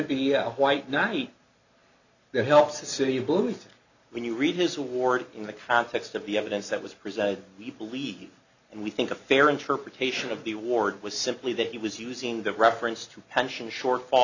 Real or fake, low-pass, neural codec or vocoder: real; 7.2 kHz; none